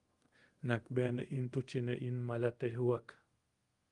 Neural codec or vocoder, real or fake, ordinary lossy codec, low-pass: codec, 24 kHz, 0.5 kbps, DualCodec; fake; Opus, 24 kbps; 10.8 kHz